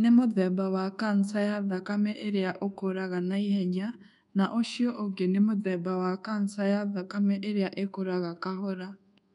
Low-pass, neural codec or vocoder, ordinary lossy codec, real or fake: 10.8 kHz; codec, 24 kHz, 1.2 kbps, DualCodec; none; fake